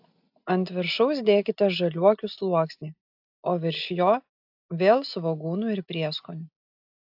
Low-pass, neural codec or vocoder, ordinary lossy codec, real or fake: 5.4 kHz; none; AAC, 48 kbps; real